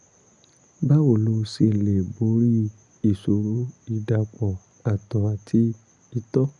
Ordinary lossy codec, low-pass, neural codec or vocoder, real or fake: none; 10.8 kHz; none; real